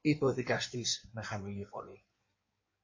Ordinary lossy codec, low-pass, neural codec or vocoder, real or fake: MP3, 32 kbps; 7.2 kHz; codec, 16 kHz in and 24 kHz out, 1.1 kbps, FireRedTTS-2 codec; fake